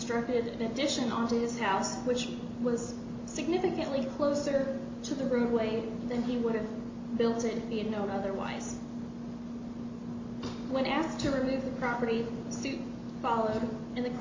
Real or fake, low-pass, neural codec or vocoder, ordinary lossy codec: real; 7.2 kHz; none; MP3, 48 kbps